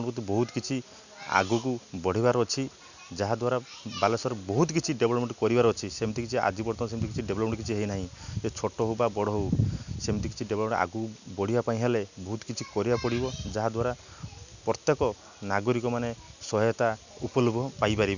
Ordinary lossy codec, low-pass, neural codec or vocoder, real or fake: none; 7.2 kHz; none; real